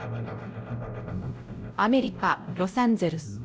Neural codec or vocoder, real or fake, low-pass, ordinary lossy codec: codec, 16 kHz, 0.5 kbps, X-Codec, WavLM features, trained on Multilingual LibriSpeech; fake; none; none